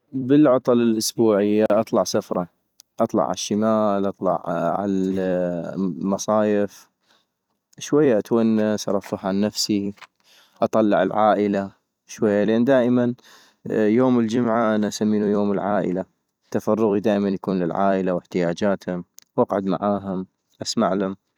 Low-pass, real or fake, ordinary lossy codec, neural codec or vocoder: 19.8 kHz; fake; none; vocoder, 44.1 kHz, 128 mel bands every 256 samples, BigVGAN v2